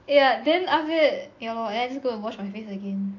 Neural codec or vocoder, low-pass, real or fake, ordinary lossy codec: none; 7.2 kHz; real; AAC, 48 kbps